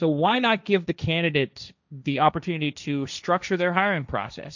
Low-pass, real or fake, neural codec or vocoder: 7.2 kHz; fake; codec, 16 kHz, 1.1 kbps, Voila-Tokenizer